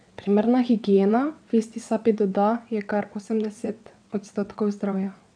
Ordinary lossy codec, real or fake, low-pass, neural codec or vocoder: none; fake; 9.9 kHz; vocoder, 22.05 kHz, 80 mel bands, WaveNeXt